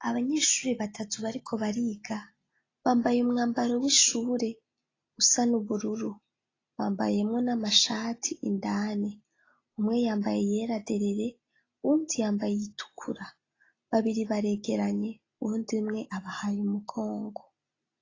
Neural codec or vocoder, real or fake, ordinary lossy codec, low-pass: none; real; AAC, 32 kbps; 7.2 kHz